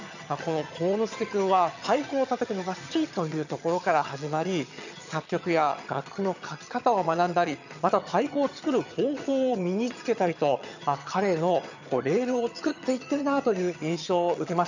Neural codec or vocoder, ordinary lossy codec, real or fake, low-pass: vocoder, 22.05 kHz, 80 mel bands, HiFi-GAN; none; fake; 7.2 kHz